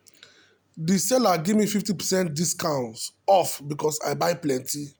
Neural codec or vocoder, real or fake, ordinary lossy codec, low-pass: none; real; none; none